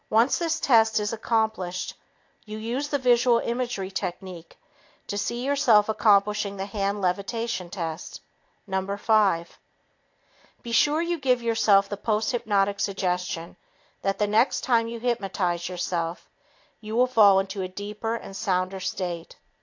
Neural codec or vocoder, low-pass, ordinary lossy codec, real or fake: none; 7.2 kHz; AAC, 48 kbps; real